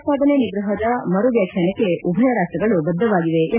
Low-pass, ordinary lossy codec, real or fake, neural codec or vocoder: 3.6 kHz; none; real; none